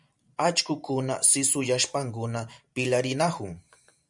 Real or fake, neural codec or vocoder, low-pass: fake; vocoder, 44.1 kHz, 128 mel bands every 512 samples, BigVGAN v2; 10.8 kHz